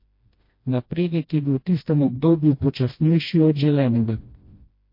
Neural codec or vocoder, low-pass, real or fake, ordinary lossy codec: codec, 16 kHz, 1 kbps, FreqCodec, smaller model; 5.4 kHz; fake; MP3, 32 kbps